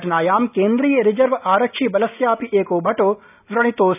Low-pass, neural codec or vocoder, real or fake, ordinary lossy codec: 3.6 kHz; none; real; none